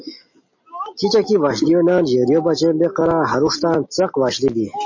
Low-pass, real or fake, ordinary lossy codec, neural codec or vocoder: 7.2 kHz; real; MP3, 32 kbps; none